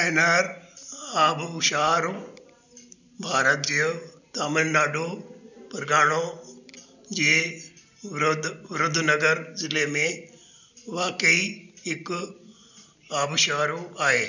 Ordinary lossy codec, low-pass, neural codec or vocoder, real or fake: none; 7.2 kHz; none; real